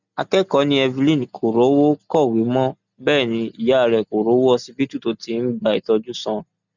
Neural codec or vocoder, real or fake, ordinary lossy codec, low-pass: none; real; none; 7.2 kHz